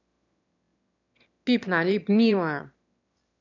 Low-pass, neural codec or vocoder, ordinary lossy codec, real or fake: 7.2 kHz; autoencoder, 22.05 kHz, a latent of 192 numbers a frame, VITS, trained on one speaker; none; fake